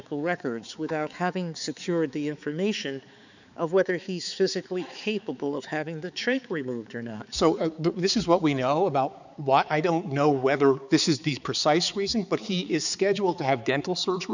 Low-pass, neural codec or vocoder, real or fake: 7.2 kHz; codec, 16 kHz, 4 kbps, X-Codec, HuBERT features, trained on balanced general audio; fake